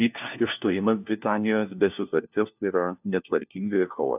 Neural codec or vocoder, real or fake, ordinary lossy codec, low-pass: codec, 16 kHz, 1 kbps, FunCodec, trained on LibriTTS, 50 frames a second; fake; AAC, 32 kbps; 3.6 kHz